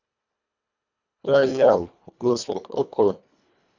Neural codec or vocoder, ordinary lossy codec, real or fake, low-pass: codec, 24 kHz, 1.5 kbps, HILCodec; none; fake; 7.2 kHz